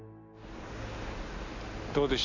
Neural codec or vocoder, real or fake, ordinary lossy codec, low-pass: none; real; none; 7.2 kHz